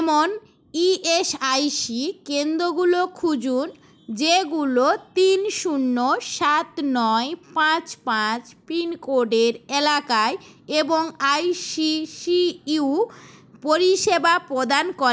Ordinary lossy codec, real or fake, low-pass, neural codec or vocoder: none; real; none; none